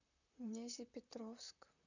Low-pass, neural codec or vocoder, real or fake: 7.2 kHz; vocoder, 44.1 kHz, 128 mel bands, Pupu-Vocoder; fake